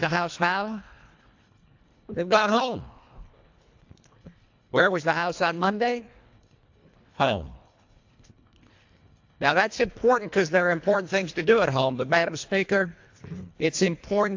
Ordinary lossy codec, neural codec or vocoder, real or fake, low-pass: AAC, 48 kbps; codec, 24 kHz, 1.5 kbps, HILCodec; fake; 7.2 kHz